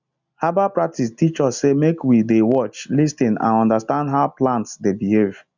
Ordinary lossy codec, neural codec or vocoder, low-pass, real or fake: none; none; 7.2 kHz; real